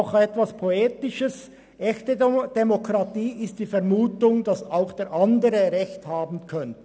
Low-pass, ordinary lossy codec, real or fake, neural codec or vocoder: none; none; real; none